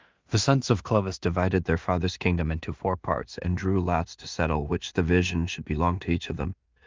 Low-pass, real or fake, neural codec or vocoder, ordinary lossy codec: 7.2 kHz; fake; codec, 16 kHz in and 24 kHz out, 0.4 kbps, LongCat-Audio-Codec, two codebook decoder; Opus, 32 kbps